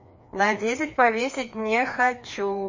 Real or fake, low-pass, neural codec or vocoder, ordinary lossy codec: fake; 7.2 kHz; codec, 16 kHz, 2 kbps, FreqCodec, larger model; MP3, 32 kbps